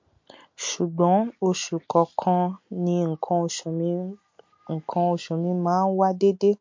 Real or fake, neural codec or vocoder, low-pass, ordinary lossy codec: real; none; 7.2 kHz; MP3, 48 kbps